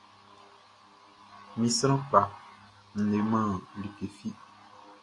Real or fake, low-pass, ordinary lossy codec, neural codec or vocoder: real; 10.8 kHz; AAC, 48 kbps; none